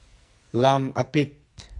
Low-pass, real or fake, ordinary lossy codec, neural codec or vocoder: 10.8 kHz; fake; MP3, 64 kbps; codec, 44.1 kHz, 2.6 kbps, SNAC